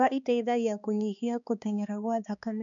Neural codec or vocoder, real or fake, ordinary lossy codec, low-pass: codec, 16 kHz, 2 kbps, X-Codec, HuBERT features, trained on balanced general audio; fake; none; 7.2 kHz